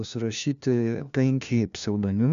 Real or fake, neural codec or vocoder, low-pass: fake; codec, 16 kHz, 1 kbps, FunCodec, trained on LibriTTS, 50 frames a second; 7.2 kHz